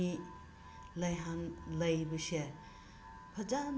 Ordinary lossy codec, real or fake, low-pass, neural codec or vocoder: none; real; none; none